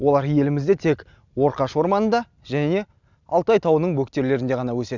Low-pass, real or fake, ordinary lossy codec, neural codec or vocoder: 7.2 kHz; real; none; none